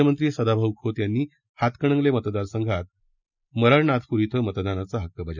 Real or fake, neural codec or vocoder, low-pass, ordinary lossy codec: real; none; 7.2 kHz; none